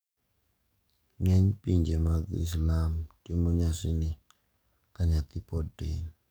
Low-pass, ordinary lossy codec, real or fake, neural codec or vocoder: none; none; fake; codec, 44.1 kHz, 7.8 kbps, DAC